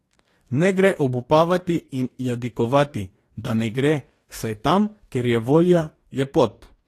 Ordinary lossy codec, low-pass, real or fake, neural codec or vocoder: AAC, 48 kbps; 14.4 kHz; fake; codec, 44.1 kHz, 2.6 kbps, DAC